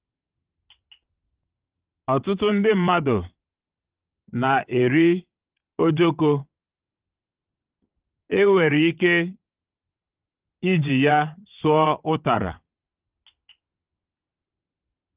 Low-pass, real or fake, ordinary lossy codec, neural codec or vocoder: 3.6 kHz; fake; Opus, 32 kbps; vocoder, 22.05 kHz, 80 mel bands, WaveNeXt